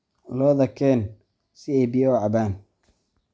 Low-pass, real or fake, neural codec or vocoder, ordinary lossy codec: none; real; none; none